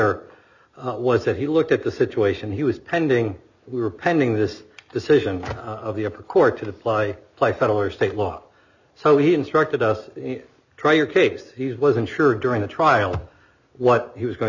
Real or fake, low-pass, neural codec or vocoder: real; 7.2 kHz; none